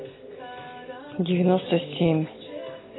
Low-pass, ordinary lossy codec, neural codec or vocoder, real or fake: 7.2 kHz; AAC, 16 kbps; none; real